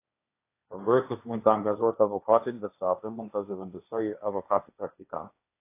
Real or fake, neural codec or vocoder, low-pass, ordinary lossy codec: fake; codec, 16 kHz, 1.1 kbps, Voila-Tokenizer; 3.6 kHz; AAC, 24 kbps